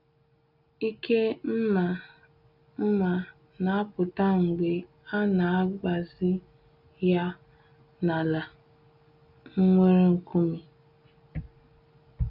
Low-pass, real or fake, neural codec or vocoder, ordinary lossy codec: 5.4 kHz; real; none; none